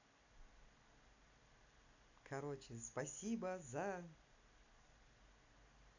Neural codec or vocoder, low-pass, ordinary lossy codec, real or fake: none; 7.2 kHz; none; real